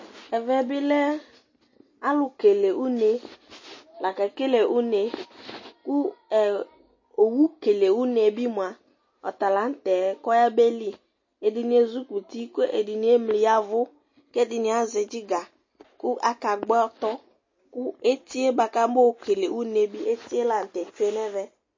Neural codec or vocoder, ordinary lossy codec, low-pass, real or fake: none; MP3, 32 kbps; 7.2 kHz; real